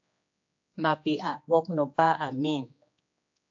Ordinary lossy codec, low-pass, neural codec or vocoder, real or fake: AAC, 64 kbps; 7.2 kHz; codec, 16 kHz, 2 kbps, X-Codec, HuBERT features, trained on general audio; fake